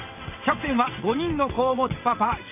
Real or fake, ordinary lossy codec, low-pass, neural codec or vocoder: fake; none; 3.6 kHz; vocoder, 22.05 kHz, 80 mel bands, WaveNeXt